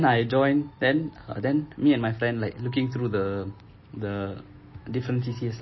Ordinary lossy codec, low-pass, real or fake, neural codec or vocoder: MP3, 24 kbps; 7.2 kHz; real; none